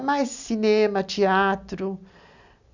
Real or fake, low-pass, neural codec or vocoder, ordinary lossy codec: real; 7.2 kHz; none; none